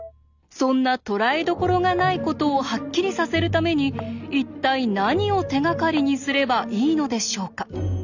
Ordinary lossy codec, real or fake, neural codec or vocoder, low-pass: none; real; none; 7.2 kHz